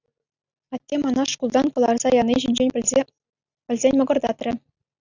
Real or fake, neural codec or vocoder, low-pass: real; none; 7.2 kHz